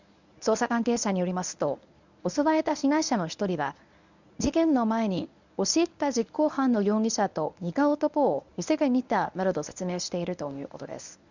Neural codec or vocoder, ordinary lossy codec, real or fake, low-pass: codec, 24 kHz, 0.9 kbps, WavTokenizer, medium speech release version 1; none; fake; 7.2 kHz